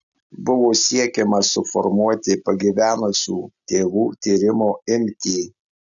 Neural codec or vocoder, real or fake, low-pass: none; real; 7.2 kHz